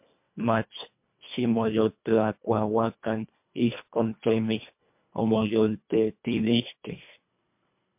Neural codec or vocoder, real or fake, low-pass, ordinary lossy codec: codec, 24 kHz, 1.5 kbps, HILCodec; fake; 3.6 kHz; MP3, 32 kbps